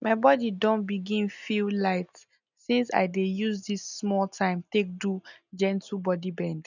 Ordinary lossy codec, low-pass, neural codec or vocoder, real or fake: none; 7.2 kHz; none; real